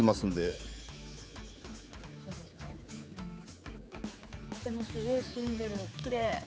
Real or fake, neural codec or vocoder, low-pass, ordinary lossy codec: fake; codec, 16 kHz, 4 kbps, X-Codec, HuBERT features, trained on general audio; none; none